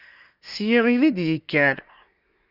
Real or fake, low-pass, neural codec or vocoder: fake; 5.4 kHz; codec, 24 kHz, 1 kbps, SNAC